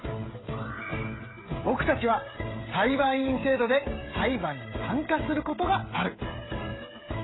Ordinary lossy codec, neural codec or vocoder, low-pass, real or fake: AAC, 16 kbps; codec, 16 kHz, 16 kbps, FreqCodec, smaller model; 7.2 kHz; fake